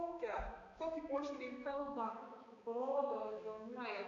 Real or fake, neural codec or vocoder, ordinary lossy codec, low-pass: fake; codec, 16 kHz, 2 kbps, X-Codec, HuBERT features, trained on balanced general audio; Opus, 64 kbps; 7.2 kHz